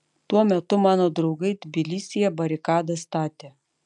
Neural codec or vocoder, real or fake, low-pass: none; real; 10.8 kHz